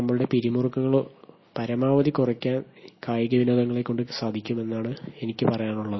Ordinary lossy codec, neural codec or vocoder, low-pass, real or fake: MP3, 24 kbps; none; 7.2 kHz; real